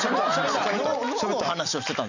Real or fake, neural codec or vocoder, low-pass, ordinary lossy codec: real; none; 7.2 kHz; none